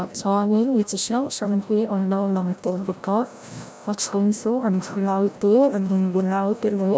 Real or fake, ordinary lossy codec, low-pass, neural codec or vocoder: fake; none; none; codec, 16 kHz, 0.5 kbps, FreqCodec, larger model